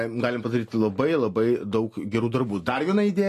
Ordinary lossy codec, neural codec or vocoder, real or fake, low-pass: AAC, 48 kbps; none; real; 14.4 kHz